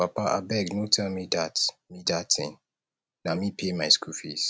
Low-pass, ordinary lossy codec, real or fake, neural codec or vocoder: none; none; real; none